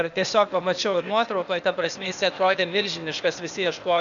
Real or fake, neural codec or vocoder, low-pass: fake; codec, 16 kHz, 0.8 kbps, ZipCodec; 7.2 kHz